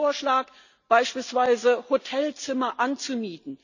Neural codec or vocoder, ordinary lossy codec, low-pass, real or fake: none; none; 7.2 kHz; real